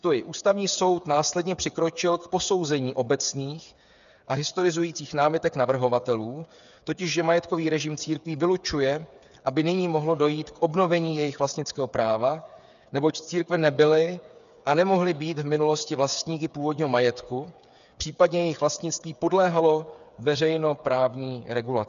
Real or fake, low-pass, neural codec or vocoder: fake; 7.2 kHz; codec, 16 kHz, 8 kbps, FreqCodec, smaller model